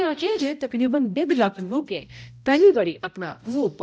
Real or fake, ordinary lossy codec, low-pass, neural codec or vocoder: fake; none; none; codec, 16 kHz, 0.5 kbps, X-Codec, HuBERT features, trained on balanced general audio